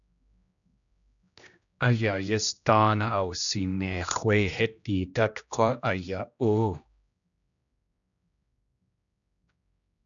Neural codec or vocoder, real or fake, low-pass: codec, 16 kHz, 1 kbps, X-Codec, HuBERT features, trained on general audio; fake; 7.2 kHz